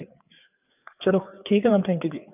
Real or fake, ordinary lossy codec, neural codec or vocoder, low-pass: fake; none; codec, 16 kHz, 4 kbps, FreqCodec, larger model; 3.6 kHz